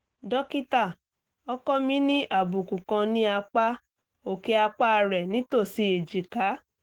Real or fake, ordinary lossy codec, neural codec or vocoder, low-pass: fake; Opus, 16 kbps; autoencoder, 48 kHz, 128 numbers a frame, DAC-VAE, trained on Japanese speech; 19.8 kHz